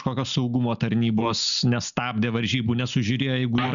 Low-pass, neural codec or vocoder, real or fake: 7.2 kHz; none; real